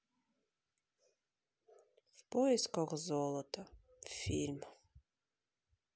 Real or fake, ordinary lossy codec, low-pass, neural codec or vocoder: real; none; none; none